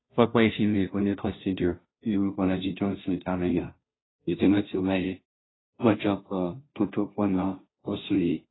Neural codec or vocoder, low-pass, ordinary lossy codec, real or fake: codec, 16 kHz, 0.5 kbps, FunCodec, trained on Chinese and English, 25 frames a second; 7.2 kHz; AAC, 16 kbps; fake